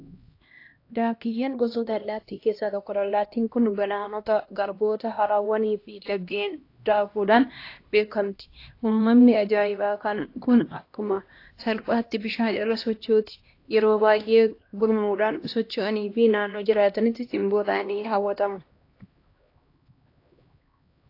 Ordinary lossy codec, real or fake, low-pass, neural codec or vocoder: AAC, 32 kbps; fake; 5.4 kHz; codec, 16 kHz, 1 kbps, X-Codec, HuBERT features, trained on LibriSpeech